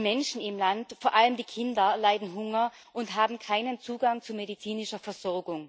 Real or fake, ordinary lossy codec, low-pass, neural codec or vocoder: real; none; none; none